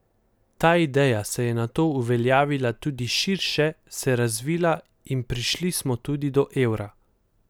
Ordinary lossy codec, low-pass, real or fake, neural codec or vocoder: none; none; real; none